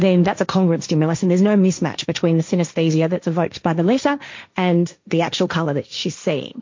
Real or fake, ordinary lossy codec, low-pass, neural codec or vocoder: fake; MP3, 48 kbps; 7.2 kHz; codec, 16 kHz, 1.1 kbps, Voila-Tokenizer